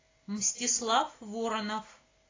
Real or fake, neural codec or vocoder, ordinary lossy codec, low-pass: real; none; AAC, 32 kbps; 7.2 kHz